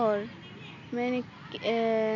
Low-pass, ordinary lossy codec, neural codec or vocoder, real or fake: 7.2 kHz; none; none; real